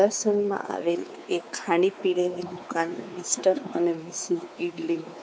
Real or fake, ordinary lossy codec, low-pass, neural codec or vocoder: fake; none; none; codec, 16 kHz, 4 kbps, X-Codec, WavLM features, trained on Multilingual LibriSpeech